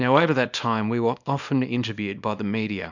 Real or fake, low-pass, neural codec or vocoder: fake; 7.2 kHz; codec, 24 kHz, 0.9 kbps, WavTokenizer, small release